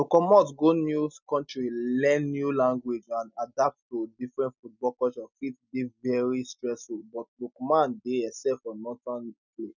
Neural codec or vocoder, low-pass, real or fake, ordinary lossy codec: none; 7.2 kHz; real; none